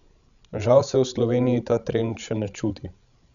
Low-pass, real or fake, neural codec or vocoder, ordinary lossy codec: 7.2 kHz; fake; codec, 16 kHz, 16 kbps, FreqCodec, larger model; none